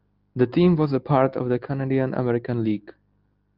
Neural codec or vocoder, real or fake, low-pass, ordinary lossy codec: none; real; 5.4 kHz; Opus, 32 kbps